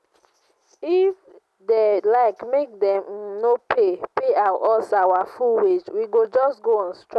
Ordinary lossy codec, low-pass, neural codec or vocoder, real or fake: none; none; none; real